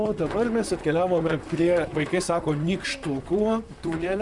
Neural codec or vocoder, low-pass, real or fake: vocoder, 44.1 kHz, 128 mel bands, Pupu-Vocoder; 10.8 kHz; fake